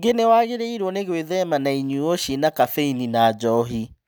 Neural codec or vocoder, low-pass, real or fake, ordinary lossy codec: none; none; real; none